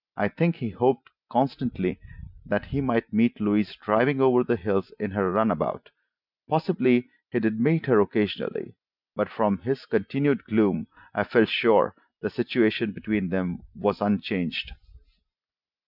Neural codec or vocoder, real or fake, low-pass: none; real; 5.4 kHz